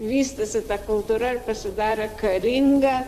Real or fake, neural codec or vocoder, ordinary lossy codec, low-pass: fake; vocoder, 44.1 kHz, 128 mel bands, Pupu-Vocoder; MP3, 96 kbps; 14.4 kHz